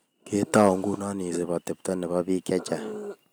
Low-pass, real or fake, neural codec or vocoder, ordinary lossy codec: none; real; none; none